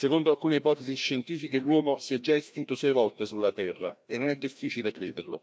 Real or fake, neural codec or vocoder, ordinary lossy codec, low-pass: fake; codec, 16 kHz, 1 kbps, FreqCodec, larger model; none; none